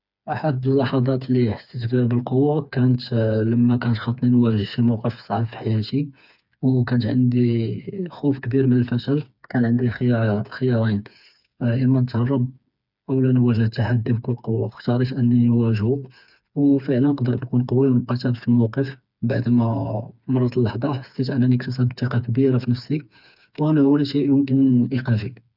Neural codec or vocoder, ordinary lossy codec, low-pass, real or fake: codec, 16 kHz, 4 kbps, FreqCodec, smaller model; none; 5.4 kHz; fake